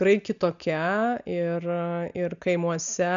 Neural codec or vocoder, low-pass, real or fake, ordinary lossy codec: none; 7.2 kHz; real; MP3, 96 kbps